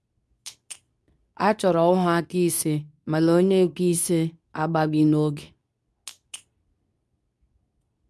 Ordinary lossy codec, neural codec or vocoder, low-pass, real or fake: none; codec, 24 kHz, 0.9 kbps, WavTokenizer, medium speech release version 2; none; fake